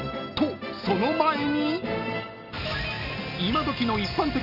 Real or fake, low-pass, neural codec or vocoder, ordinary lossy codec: real; 5.4 kHz; none; none